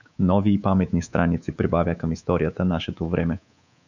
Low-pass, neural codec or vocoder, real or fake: 7.2 kHz; codec, 24 kHz, 3.1 kbps, DualCodec; fake